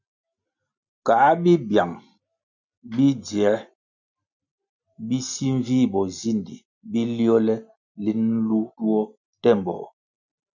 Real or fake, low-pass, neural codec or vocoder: real; 7.2 kHz; none